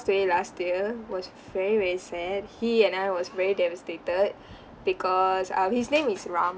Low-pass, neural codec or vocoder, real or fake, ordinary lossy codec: none; none; real; none